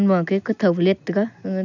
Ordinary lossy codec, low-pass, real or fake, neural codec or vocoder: none; 7.2 kHz; real; none